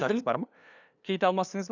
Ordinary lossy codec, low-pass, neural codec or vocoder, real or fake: none; 7.2 kHz; codec, 16 kHz, 2 kbps, FunCodec, trained on LibriTTS, 25 frames a second; fake